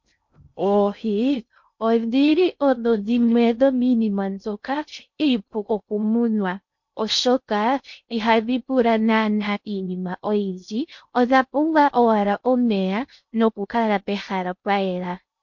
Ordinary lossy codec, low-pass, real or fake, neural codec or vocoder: MP3, 48 kbps; 7.2 kHz; fake; codec, 16 kHz in and 24 kHz out, 0.6 kbps, FocalCodec, streaming, 2048 codes